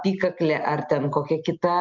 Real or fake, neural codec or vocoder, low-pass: real; none; 7.2 kHz